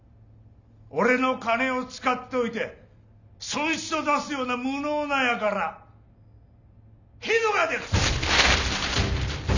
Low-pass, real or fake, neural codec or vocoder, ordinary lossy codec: 7.2 kHz; real; none; none